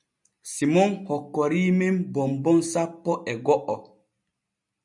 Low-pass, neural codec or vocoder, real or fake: 10.8 kHz; none; real